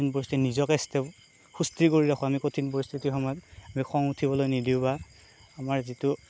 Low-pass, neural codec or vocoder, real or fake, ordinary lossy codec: none; none; real; none